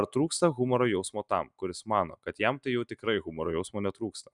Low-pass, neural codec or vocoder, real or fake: 10.8 kHz; none; real